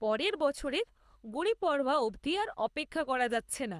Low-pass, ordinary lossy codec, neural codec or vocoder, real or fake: none; none; codec, 24 kHz, 6 kbps, HILCodec; fake